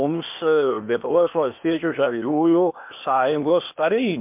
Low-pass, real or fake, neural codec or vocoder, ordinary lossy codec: 3.6 kHz; fake; codec, 16 kHz, 0.8 kbps, ZipCodec; MP3, 32 kbps